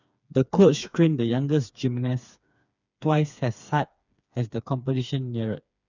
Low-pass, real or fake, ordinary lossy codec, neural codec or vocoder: 7.2 kHz; fake; none; codec, 16 kHz, 4 kbps, FreqCodec, smaller model